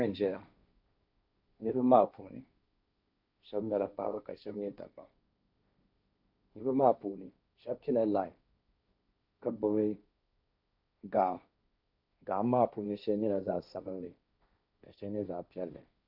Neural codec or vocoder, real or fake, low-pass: codec, 16 kHz, 1.1 kbps, Voila-Tokenizer; fake; 5.4 kHz